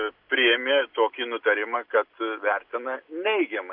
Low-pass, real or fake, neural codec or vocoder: 5.4 kHz; real; none